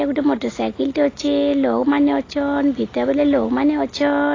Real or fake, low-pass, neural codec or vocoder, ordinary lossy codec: real; 7.2 kHz; none; AAC, 32 kbps